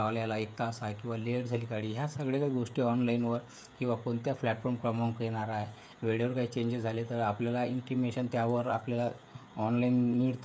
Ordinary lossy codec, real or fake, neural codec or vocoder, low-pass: none; fake; codec, 16 kHz, 16 kbps, FreqCodec, smaller model; none